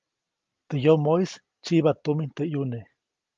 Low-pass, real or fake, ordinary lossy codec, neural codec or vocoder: 7.2 kHz; real; Opus, 32 kbps; none